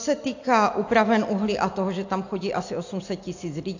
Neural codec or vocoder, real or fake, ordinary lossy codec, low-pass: none; real; AAC, 48 kbps; 7.2 kHz